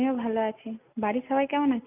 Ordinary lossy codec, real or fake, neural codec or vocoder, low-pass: none; real; none; 3.6 kHz